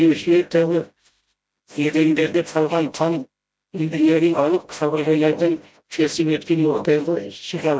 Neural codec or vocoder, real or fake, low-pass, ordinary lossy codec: codec, 16 kHz, 0.5 kbps, FreqCodec, smaller model; fake; none; none